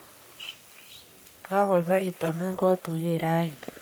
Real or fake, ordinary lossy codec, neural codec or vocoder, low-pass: fake; none; codec, 44.1 kHz, 1.7 kbps, Pupu-Codec; none